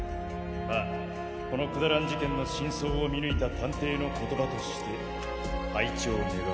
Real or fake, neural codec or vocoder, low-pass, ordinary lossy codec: real; none; none; none